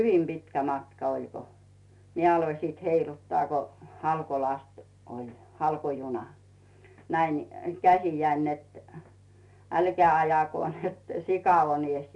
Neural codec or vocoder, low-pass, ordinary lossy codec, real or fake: none; 10.8 kHz; none; real